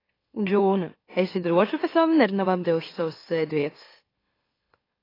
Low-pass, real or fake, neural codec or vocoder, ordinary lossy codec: 5.4 kHz; fake; autoencoder, 44.1 kHz, a latent of 192 numbers a frame, MeloTTS; AAC, 24 kbps